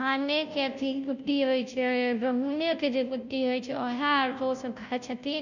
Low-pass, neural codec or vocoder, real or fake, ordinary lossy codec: 7.2 kHz; codec, 16 kHz, 0.5 kbps, FunCodec, trained on Chinese and English, 25 frames a second; fake; none